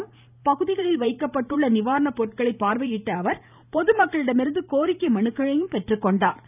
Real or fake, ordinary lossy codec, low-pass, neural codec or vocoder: fake; none; 3.6 kHz; vocoder, 44.1 kHz, 128 mel bands every 512 samples, BigVGAN v2